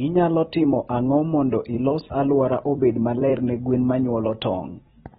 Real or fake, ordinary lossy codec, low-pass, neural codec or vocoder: fake; AAC, 16 kbps; 19.8 kHz; vocoder, 44.1 kHz, 128 mel bands every 256 samples, BigVGAN v2